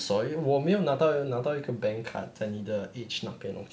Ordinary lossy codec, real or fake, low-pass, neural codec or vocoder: none; real; none; none